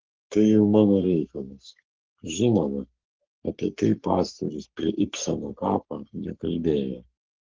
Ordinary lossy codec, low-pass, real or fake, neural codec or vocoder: Opus, 16 kbps; 7.2 kHz; fake; codec, 44.1 kHz, 3.4 kbps, Pupu-Codec